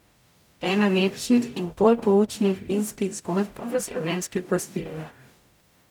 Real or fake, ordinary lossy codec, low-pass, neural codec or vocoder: fake; none; 19.8 kHz; codec, 44.1 kHz, 0.9 kbps, DAC